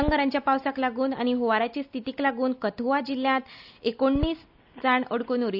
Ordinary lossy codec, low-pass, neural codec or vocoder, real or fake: none; 5.4 kHz; none; real